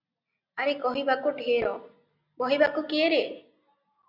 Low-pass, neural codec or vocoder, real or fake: 5.4 kHz; none; real